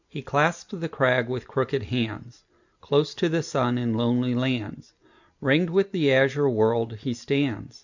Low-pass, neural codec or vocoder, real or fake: 7.2 kHz; none; real